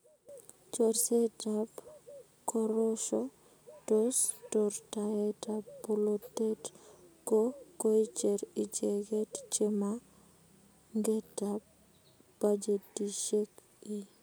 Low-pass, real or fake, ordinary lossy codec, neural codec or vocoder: none; real; none; none